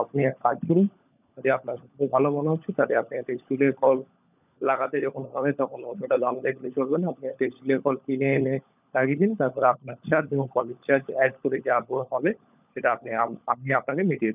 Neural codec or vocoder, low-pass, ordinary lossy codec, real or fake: codec, 16 kHz, 16 kbps, FunCodec, trained on LibriTTS, 50 frames a second; 3.6 kHz; none; fake